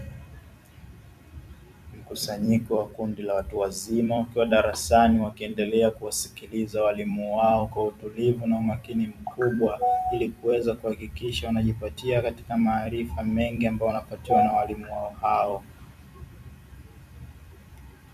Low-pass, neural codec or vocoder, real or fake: 14.4 kHz; vocoder, 44.1 kHz, 128 mel bands every 256 samples, BigVGAN v2; fake